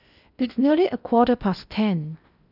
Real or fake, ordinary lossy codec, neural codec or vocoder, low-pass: fake; none; codec, 16 kHz in and 24 kHz out, 0.8 kbps, FocalCodec, streaming, 65536 codes; 5.4 kHz